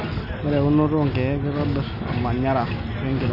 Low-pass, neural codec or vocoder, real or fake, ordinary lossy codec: 5.4 kHz; none; real; none